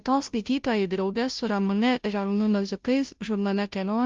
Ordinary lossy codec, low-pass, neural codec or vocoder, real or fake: Opus, 32 kbps; 7.2 kHz; codec, 16 kHz, 0.5 kbps, FunCodec, trained on Chinese and English, 25 frames a second; fake